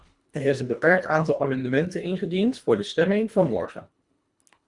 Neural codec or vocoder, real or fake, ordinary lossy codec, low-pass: codec, 24 kHz, 1.5 kbps, HILCodec; fake; Opus, 64 kbps; 10.8 kHz